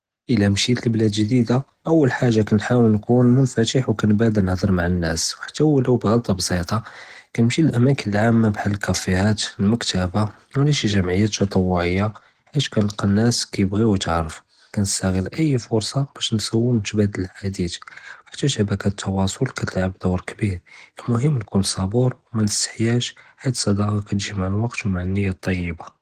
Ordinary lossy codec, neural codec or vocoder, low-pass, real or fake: Opus, 16 kbps; none; 14.4 kHz; real